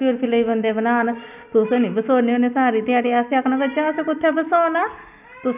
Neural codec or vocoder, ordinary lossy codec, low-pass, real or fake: none; none; 3.6 kHz; real